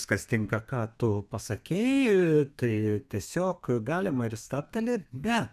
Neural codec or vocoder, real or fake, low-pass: codec, 32 kHz, 1.9 kbps, SNAC; fake; 14.4 kHz